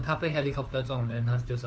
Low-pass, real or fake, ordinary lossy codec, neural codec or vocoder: none; fake; none; codec, 16 kHz, 4 kbps, FunCodec, trained on LibriTTS, 50 frames a second